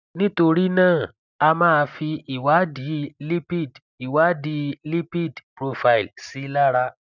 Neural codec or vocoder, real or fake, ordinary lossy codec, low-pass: none; real; none; 7.2 kHz